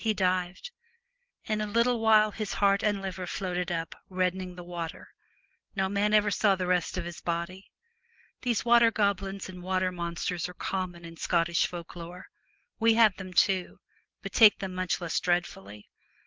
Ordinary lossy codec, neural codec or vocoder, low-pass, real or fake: Opus, 16 kbps; none; 7.2 kHz; real